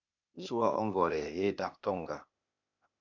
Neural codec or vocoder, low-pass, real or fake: codec, 16 kHz, 0.8 kbps, ZipCodec; 7.2 kHz; fake